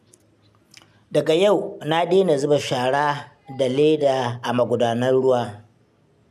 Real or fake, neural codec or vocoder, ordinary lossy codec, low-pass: real; none; none; 14.4 kHz